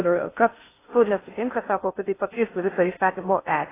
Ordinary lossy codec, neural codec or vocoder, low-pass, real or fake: AAC, 16 kbps; codec, 16 kHz in and 24 kHz out, 0.6 kbps, FocalCodec, streaming, 4096 codes; 3.6 kHz; fake